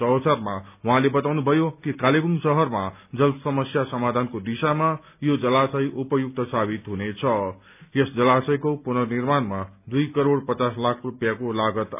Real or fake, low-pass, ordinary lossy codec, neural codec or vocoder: real; 3.6 kHz; none; none